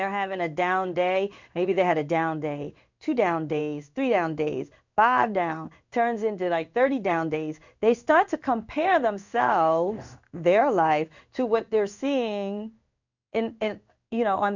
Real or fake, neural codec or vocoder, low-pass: fake; codec, 16 kHz in and 24 kHz out, 1 kbps, XY-Tokenizer; 7.2 kHz